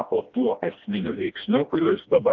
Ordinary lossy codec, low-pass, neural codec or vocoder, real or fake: Opus, 32 kbps; 7.2 kHz; codec, 16 kHz, 1 kbps, FreqCodec, smaller model; fake